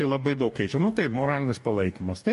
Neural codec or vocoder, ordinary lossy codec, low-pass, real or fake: codec, 44.1 kHz, 2.6 kbps, DAC; MP3, 48 kbps; 14.4 kHz; fake